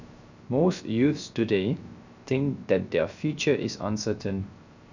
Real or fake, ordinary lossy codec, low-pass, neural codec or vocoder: fake; none; 7.2 kHz; codec, 16 kHz, 0.3 kbps, FocalCodec